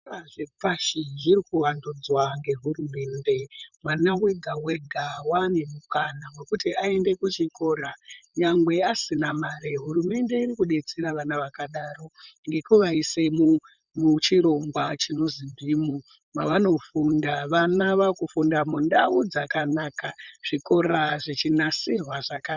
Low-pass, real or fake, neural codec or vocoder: 7.2 kHz; fake; vocoder, 44.1 kHz, 128 mel bands, Pupu-Vocoder